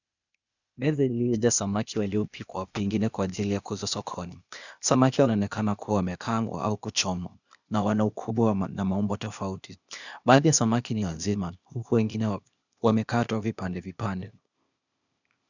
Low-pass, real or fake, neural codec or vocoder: 7.2 kHz; fake; codec, 16 kHz, 0.8 kbps, ZipCodec